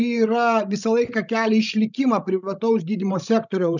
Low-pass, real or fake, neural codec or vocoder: 7.2 kHz; fake; codec, 16 kHz, 16 kbps, FreqCodec, larger model